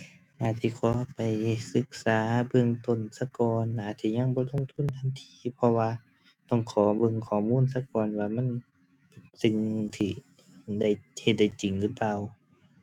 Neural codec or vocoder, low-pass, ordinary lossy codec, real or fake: autoencoder, 48 kHz, 128 numbers a frame, DAC-VAE, trained on Japanese speech; 19.8 kHz; none; fake